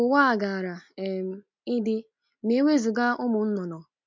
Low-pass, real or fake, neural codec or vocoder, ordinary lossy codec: 7.2 kHz; real; none; MP3, 48 kbps